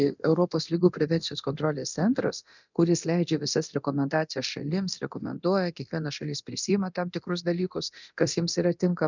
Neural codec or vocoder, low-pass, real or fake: codec, 24 kHz, 0.9 kbps, DualCodec; 7.2 kHz; fake